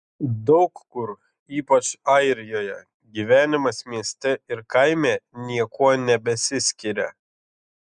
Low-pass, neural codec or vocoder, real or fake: 10.8 kHz; none; real